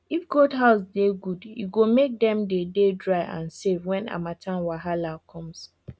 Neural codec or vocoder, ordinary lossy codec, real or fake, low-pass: none; none; real; none